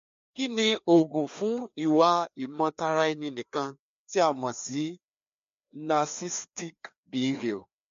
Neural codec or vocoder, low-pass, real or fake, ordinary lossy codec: codec, 16 kHz, 2 kbps, FreqCodec, larger model; 7.2 kHz; fake; MP3, 48 kbps